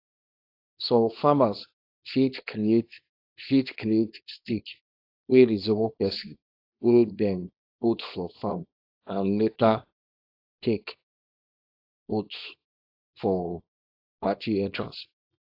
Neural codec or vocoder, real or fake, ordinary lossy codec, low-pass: codec, 24 kHz, 0.9 kbps, WavTokenizer, small release; fake; AAC, 32 kbps; 5.4 kHz